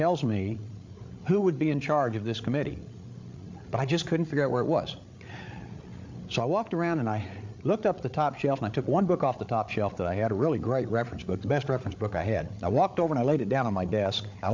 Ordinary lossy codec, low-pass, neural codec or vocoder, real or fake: MP3, 64 kbps; 7.2 kHz; codec, 16 kHz, 8 kbps, FreqCodec, larger model; fake